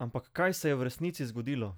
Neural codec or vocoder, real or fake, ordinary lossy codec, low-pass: none; real; none; none